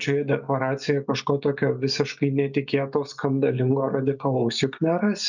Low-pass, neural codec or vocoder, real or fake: 7.2 kHz; none; real